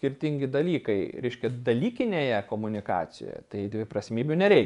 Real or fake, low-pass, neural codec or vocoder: fake; 10.8 kHz; vocoder, 24 kHz, 100 mel bands, Vocos